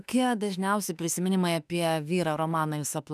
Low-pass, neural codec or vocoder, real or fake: 14.4 kHz; autoencoder, 48 kHz, 32 numbers a frame, DAC-VAE, trained on Japanese speech; fake